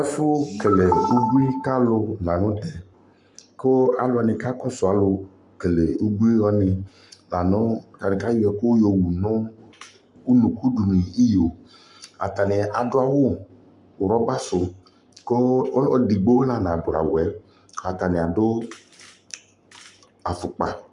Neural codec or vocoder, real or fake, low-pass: codec, 44.1 kHz, 7.8 kbps, Pupu-Codec; fake; 10.8 kHz